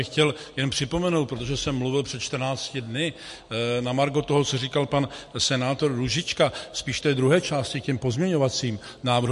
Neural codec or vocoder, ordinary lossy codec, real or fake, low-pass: none; MP3, 48 kbps; real; 14.4 kHz